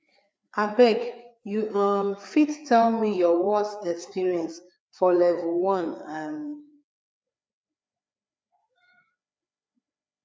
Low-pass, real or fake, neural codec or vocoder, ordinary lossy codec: none; fake; codec, 16 kHz, 4 kbps, FreqCodec, larger model; none